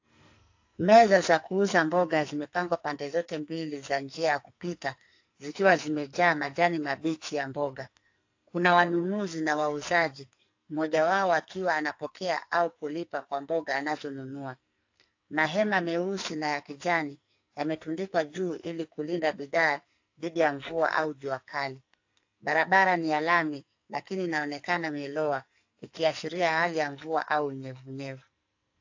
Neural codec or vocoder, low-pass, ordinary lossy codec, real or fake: codec, 44.1 kHz, 2.6 kbps, SNAC; 7.2 kHz; AAC, 48 kbps; fake